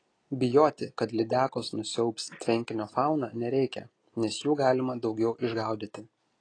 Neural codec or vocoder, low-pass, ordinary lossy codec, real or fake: none; 9.9 kHz; AAC, 32 kbps; real